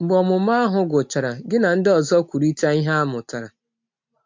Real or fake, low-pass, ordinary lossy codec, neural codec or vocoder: real; 7.2 kHz; MP3, 48 kbps; none